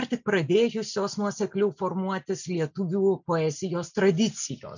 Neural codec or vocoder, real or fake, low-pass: none; real; 7.2 kHz